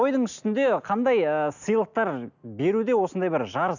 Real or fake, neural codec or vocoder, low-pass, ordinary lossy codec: real; none; 7.2 kHz; none